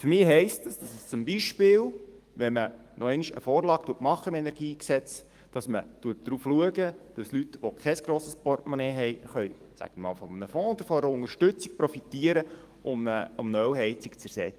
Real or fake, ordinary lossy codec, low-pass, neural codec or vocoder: fake; Opus, 32 kbps; 14.4 kHz; codec, 44.1 kHz, 7.8 kbps, DAC